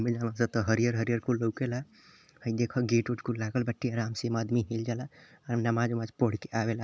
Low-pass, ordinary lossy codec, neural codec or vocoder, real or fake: none; none; none; real